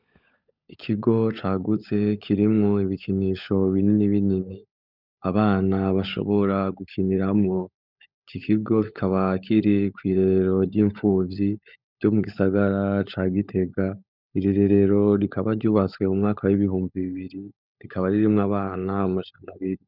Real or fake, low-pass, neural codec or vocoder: fake; 5.4 kHz; codec, 16 kHz, 16 kbps, FunCodec, trained on LibriTTS, 50 frames a second